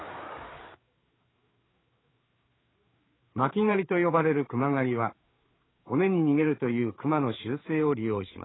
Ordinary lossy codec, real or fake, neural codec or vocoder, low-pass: AAC, 16 kbps; fake; codec, 16 kHz, 4 kbps, FreqCodec, larger model; 7.2 kHz